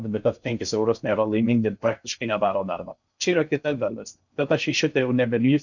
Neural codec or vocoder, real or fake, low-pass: codec, 16 kHz in and 24 kHz out, 0.6 kbps, FocalCodec, streaming, 4096 codes; fake; 7.2 kHz